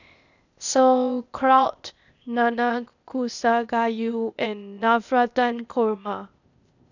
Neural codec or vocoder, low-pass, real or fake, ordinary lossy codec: codec, 16 kHz, 0.8 kbps, ZipCodec; 7.2 kHz; fake; none